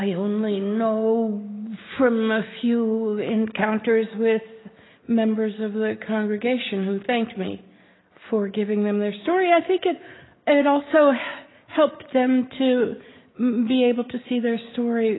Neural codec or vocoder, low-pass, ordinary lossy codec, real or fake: none; 7.2 kHz; AAC, 16 kbps; real